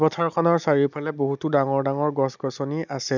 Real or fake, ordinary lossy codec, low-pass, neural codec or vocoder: real; none; 7.2 kHz; none